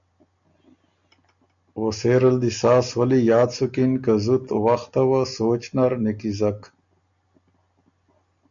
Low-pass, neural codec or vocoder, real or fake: 7.2 kHz; none; real